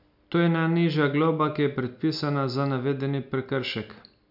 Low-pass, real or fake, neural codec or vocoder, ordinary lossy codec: 5.4 kHz; real; none; none